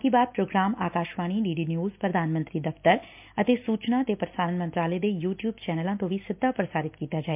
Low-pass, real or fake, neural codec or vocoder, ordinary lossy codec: 3.6 kHz; real; none; MP3, 32 kbps